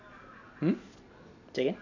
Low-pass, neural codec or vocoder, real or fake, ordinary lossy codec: 7.2 kHz; none; real; none